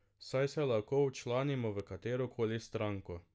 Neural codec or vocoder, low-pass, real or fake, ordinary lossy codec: none; none; real; none